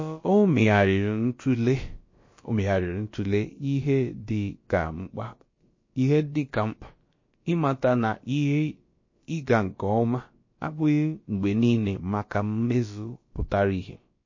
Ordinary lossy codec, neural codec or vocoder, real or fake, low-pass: MP3, 32 kbps; codec, 16 kHz, about 1 kbps, DyCAST, with the encoder's durations; fake; 7.2 kHz